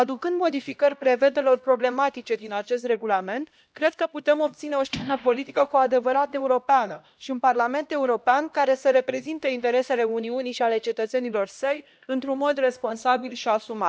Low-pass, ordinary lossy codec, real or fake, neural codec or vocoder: none; none; fake; codec, 16 kHz, 1 kbps, X-Codec, HuBERT features, trained on LibriSpeech